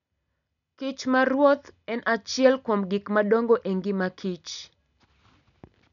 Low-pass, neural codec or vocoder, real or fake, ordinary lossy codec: 7.2 kHz; none; real; none